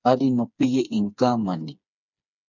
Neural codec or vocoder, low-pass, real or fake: codec, 16 kHz, 4 kbps, FreqCodec, smaller model; 7.2 kHz; fake